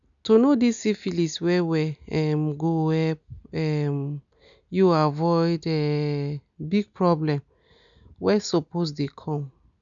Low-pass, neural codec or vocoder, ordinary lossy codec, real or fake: 7.2 kHz; none; none; real